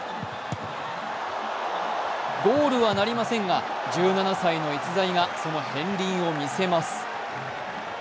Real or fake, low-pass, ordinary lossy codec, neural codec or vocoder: real; none; none; none